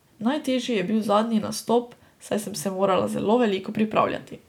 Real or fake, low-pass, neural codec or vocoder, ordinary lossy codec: real; 19.8 kHz; none; none